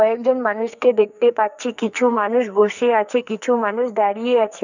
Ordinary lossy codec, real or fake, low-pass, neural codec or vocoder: none; fake; 7.2 kHz; codec, 44.1 kHz, 2.6 kbps, SNAC